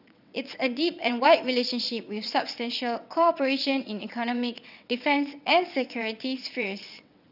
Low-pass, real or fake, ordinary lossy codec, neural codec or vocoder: 5.4 kHz; fake; none; vocoder, 22.05 kHz, 80 mel bands, WaveNeXt